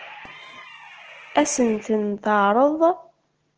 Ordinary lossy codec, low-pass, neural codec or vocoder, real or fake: Opus, 16 kbps; 7.2 kHz; none; real